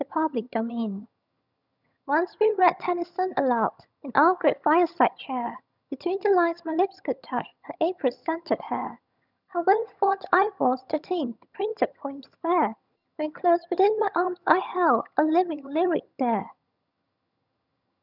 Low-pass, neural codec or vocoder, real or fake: 5.4 kHz; vocoder, 22.05 kHz, 80 mel bands, HiFi-GAN; fake